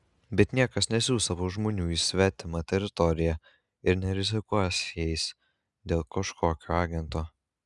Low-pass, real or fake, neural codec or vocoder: 10.8 kHz; real; none